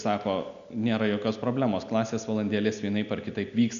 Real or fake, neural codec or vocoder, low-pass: real; none; 7.2 kHz